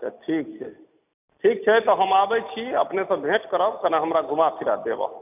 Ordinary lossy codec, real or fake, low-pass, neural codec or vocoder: none; real; 3.6 kHz; none